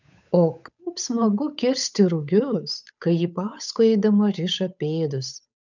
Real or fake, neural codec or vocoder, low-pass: fake; codec, 16 kHz, 8 kbps, FunCodec, trained on Chinese and English, 25 frames a second; 7.2 kHz